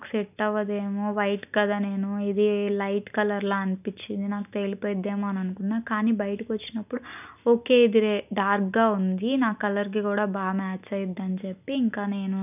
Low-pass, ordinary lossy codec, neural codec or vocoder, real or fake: 3.6 kHz; none; none; real